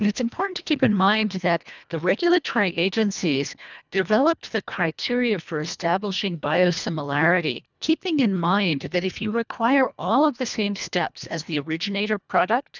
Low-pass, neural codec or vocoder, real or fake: 7.2 kHz; codec, 24 kHz, 1.5 kbps, HILCodec; fake